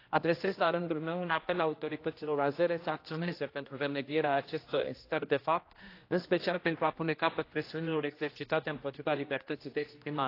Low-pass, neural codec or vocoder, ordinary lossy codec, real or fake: 5.4 kHz; codec, 16 kHz, 1 kbps, X-Codec, HuBERT features, trained on general audio; AAC, 32 kbps; fake